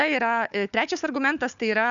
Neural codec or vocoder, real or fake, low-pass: codec, 16 kHz, 4 kbps, FunCodec, trained on Chinese and English, 50 frames a second; fake; 7.2 kHz